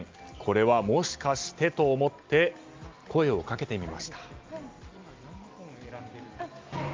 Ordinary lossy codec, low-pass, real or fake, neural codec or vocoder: Opus, 32 kbps; 7.2 kHz; real; none